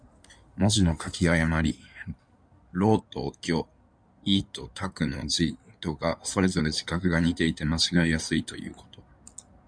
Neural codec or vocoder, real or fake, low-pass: codec, 16 kHz in and 24 kHz out, 2.2 kbps, FireRedTTS-2 codec; fake; 9.9 kHz